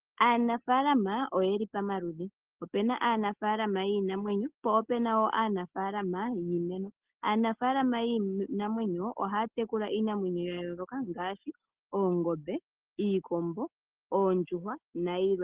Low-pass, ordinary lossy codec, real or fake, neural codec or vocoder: 3.6 kHz; Opus, 16 kbps; real; none